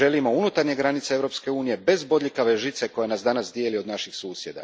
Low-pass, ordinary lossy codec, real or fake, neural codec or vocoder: none; none; real; none